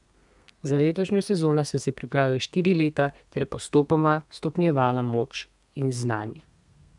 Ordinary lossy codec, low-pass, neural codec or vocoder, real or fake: none; 10.8 kHz; codec, 32 kHz, 1.9 kbps, SNAC; fake